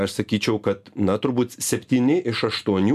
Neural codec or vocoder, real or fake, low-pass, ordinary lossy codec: none; real; 14.4 kHz; MP3, 96 kbps